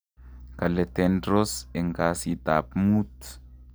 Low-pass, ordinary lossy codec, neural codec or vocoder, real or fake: none; none; none; real